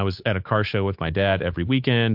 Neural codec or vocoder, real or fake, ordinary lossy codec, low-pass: codec, 16 kHz, 8 kbps, FunCodec, trained on Chinese and English, 25 frames a second; fake; MP3, 48 kbps; 5.4 kHz